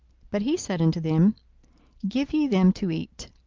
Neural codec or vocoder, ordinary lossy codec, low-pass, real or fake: none; Opus, 24 kbps; 7.2 kHz; real